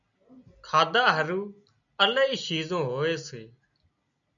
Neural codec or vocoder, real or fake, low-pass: none; real; 7.2 kHz